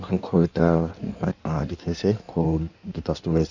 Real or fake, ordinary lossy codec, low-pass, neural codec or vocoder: fake; none; 7.2 kHz; codec, 16 kHz in and 24 kHz out, 1.1 kbps, FireRedTTS-2 codec